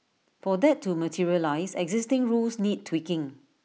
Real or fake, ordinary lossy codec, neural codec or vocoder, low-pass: real; none; none; none